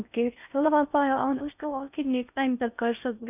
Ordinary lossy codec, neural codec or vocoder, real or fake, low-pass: none; codec, 16 kHz in and 24 kHz out, 0.6 kbps, FocalCodec, streaming, 4096 codes; fake; 3.6 kHz